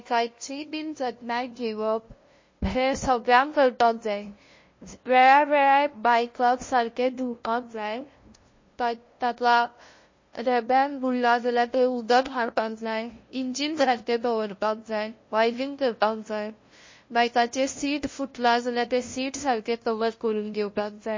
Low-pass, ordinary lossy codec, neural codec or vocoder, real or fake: 7.2 kHz; MP3, 32 kbps; codec, 16 kHz, 0.5 kbps, FunCodec, trained on LibriTTS, 25 frames a second; fake